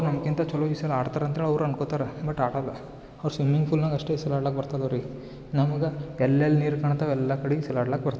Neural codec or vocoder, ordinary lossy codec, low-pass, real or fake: none; none; none; real